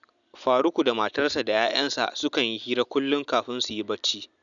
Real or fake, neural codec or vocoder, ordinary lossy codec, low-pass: real; none; none; 7.2 kHz